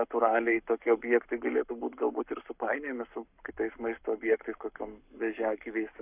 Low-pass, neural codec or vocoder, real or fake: 3.6 kHz; codec, 44.1 kHz, 7.8 kbps, Pupu-Codec; fake